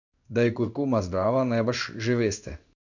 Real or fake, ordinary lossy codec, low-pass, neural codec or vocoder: fake; none; 7.2 kHz; codec, 16 kHz in and 24 kHz out, 1 kbps, XY-Tokenizer